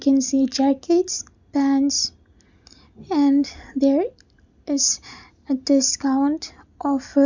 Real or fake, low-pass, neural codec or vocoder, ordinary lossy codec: real; 7.2 kHz; none; none